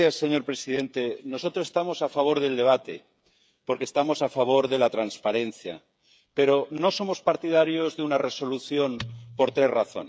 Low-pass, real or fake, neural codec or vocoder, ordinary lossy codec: none; fake; codec, 16 kHz, 8 kbps, FreqCodec, smaller model; none